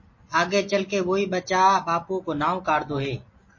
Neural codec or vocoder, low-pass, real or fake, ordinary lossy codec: none; 7.2 kHz; real; MP3, 32 kbps